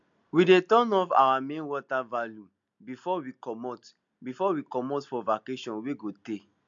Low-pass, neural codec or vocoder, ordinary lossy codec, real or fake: 7.2 kHz; none; MP3, 64 kbps; real